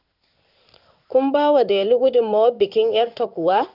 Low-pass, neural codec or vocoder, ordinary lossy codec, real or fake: 5.4 kHz; codec, 16 kHz in and 24 kHz out, 1 kbps, XY-Tokenizer; none; fake